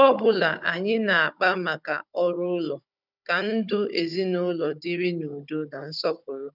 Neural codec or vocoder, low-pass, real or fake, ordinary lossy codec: codec, 16 kHz, 16 kbps, FunCodec, trained on Chinese and English, 50 frames a second; 5.4 kHz; fake; none